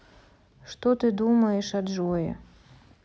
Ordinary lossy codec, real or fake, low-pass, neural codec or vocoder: none; real; none; none